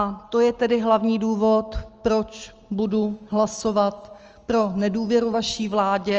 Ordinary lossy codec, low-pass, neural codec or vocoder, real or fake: Opus, 24 kbps; 7.2 kHz; none; real